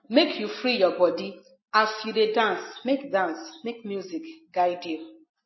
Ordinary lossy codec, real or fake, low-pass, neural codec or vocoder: MP3, 24 kbps; real; 7.2 kHz; none